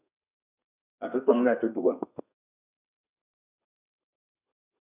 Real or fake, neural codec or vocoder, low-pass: fake; codec, 32 kHz, 1.9 kbps, SNAC; 3.6 kHz